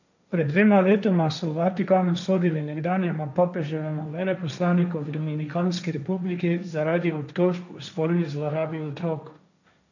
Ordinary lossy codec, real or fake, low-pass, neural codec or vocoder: none; fake; 7.2 kHz; codec, 16 kHz, 1.1 kbps, Voila-Tokenizer